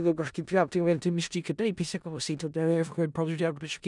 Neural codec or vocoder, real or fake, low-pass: codec, 16 kHz in and 24 kHz out, 0.4 kbps, LongCat-Audio-Codec, four codebook decoder; fake; 10.8 kHz